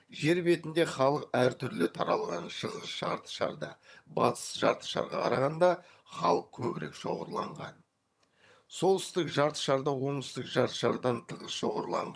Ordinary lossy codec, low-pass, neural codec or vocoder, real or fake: none; none; vocoder, 22.05 kHz, 80 mel bands, HiFi-GAN; fake